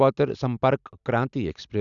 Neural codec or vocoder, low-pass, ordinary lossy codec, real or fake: none; 7.2 kHz; none; real